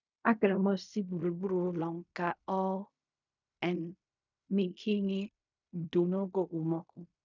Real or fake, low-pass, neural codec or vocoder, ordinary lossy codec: fake; 7.2 kHz; codec, 16 kHz in and 24 kHz out, 0.4 kbps, LongCat-Audio-Codec, fine tuned four codebook decoder; none